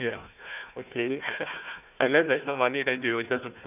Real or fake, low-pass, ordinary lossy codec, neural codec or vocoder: fake; 3.6 kHz; none; codec, 16 kHz, 1 kbps, FunCodec, trained on Chinese and English, 50 frames a second